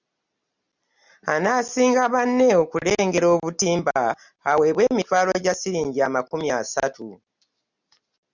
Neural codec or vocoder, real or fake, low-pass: none; real; 7.2 kHz